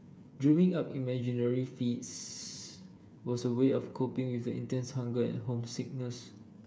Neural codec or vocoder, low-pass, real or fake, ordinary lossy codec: codec, 16 kHz, 8 kbps, FreqCodec, smaller model; none; fake; none